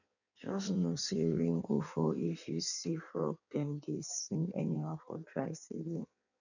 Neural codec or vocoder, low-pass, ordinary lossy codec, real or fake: codec, 16 kHz in and 24 kHz out, 1.1 kbps, FireRedTTS-2 codec; 7.2 kHz; none; fake